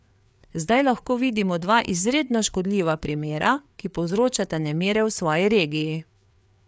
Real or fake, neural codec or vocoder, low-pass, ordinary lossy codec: fake; codec, 16 kHz, 4 kbps, FreqCodec, larger model; none; none